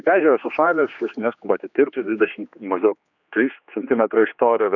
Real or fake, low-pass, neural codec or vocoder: fake; 7.2 kHz; codec, 16 kHz, 2 kbps, X-Codec, HuBERT features, trained on general audio